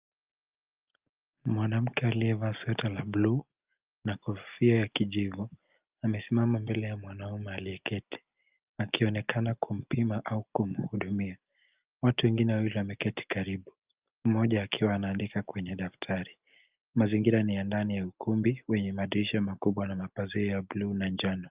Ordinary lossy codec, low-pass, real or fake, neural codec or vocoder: Opus, 24 kbps; 3.6 kHz; real; none